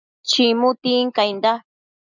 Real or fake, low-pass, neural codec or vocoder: real; 7.2 kHz; none